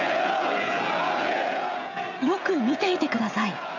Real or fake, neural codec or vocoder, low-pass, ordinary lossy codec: fake; codec, 16 kHz, 16 kbps, FreqCodec, smaller model; 7.2 kHz; AAC, 48 kbps